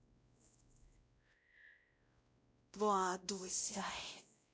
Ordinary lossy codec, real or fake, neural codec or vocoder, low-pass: none; fake; codec, 16 kHz, 0.5 kbps, X-Codec, WavLM features, trained on Multilingual LibriSpeech; none